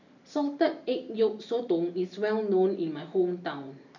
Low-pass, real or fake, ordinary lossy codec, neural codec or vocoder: 7.2 kHz; real; none; none